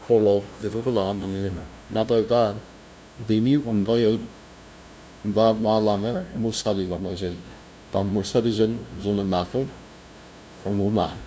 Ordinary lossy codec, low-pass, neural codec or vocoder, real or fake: none; none; codec, 16 kHz, 0.5 kbps, FunCodec, trained on LibriTTS, 25 frames a second; fake